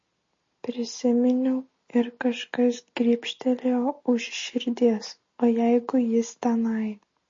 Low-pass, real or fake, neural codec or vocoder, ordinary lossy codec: 7.2 kHz; real; none; MP3, 32 kbps